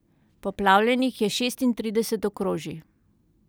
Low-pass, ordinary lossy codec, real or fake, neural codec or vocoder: none; none; real; none